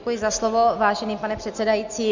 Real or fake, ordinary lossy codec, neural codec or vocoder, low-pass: real; Opus, 64 kbps; none; 7.2 kHz